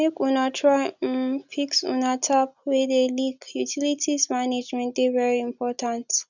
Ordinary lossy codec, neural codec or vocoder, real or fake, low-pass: none; none; real; 7.2 kHz